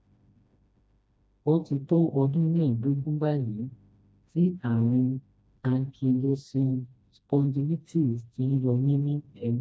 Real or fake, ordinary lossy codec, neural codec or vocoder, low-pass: fake; none; codec, 16 kHz, 1 kbps, FreqCodec, smaller model; none